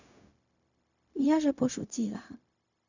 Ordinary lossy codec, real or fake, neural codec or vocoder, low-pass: none; fake; codec, 16 kHz, 0.4 kbps, LongCat-Audio-Codec; 7.2 kHz